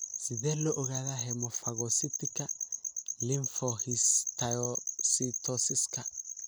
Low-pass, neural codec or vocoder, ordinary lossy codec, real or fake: none; none; none; real